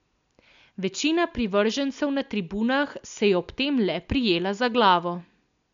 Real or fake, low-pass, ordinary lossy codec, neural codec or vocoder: real; 7.2 kHz; MP3, 64 kbps; none